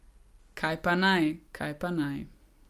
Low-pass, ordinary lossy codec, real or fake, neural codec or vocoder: 14.4 kHz; Opus, 32 kbps; real; none